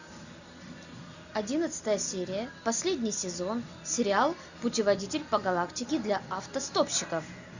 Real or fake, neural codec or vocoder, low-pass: real; none; 7.2 kHz